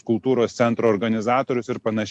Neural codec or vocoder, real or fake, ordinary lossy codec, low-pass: none; real; MP3, 64 kbps; 10.8 kHz